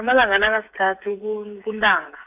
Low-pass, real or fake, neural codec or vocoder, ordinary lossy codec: 3.6 kHz; fake; codec, 16 kHz, 8 kbps, FreqCodec, smaller model; AAC, 24 kbps